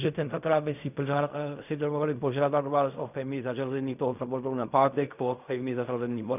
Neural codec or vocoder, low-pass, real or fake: codec, 16 kHz in and 24 kHz out, 0.4 kbps, LongCat-Audio-Codec, fine tuned four codebook decoder; 3.6 kHz; fake